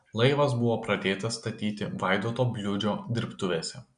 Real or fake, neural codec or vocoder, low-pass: real; none; 9.9 kHz